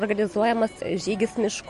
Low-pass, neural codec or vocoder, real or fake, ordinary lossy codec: 14.4 kHz; none; real; MP3, 48 kbps